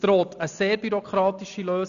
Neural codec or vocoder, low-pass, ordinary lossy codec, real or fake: none; 7.2 kHz; none; real